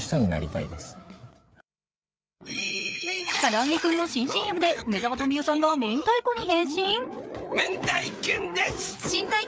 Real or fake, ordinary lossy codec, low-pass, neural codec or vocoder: fake; none; none; codec, 16 kHz, 4 kbps, FreqCodec, larger model